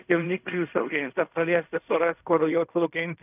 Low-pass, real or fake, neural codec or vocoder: 3.6 kHz; fake; codec, 16 kHz in and 24 kHz out, 0.4 kbps, LongCat-Audio-Codec, fine tuned four codebook decoder